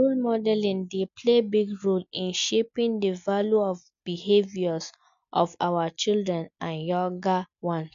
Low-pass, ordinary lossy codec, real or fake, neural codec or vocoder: 7.2 kHz; MP3, 64 kbps; real; none